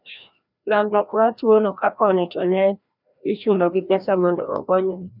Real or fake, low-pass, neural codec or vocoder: fake; 5.4 kHz; codec, 16 kHz, 1 kbps, FreqCodec, larger model